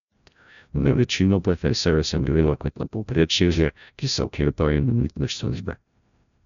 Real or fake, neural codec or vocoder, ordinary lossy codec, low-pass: fake; codec, 16 kHz, 0.5 kbps, FreqCodec, larger model; none; 7.2 kHz